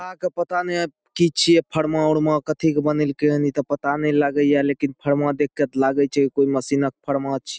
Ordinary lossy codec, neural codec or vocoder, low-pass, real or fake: none; none; none; real